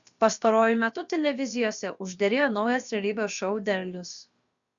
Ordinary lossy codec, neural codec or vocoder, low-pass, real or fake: Opus, 64 kbps; codec, 16 kHz, about 1 kbps, DyCAST, with the encoder's durations; 7.2 kHz; fake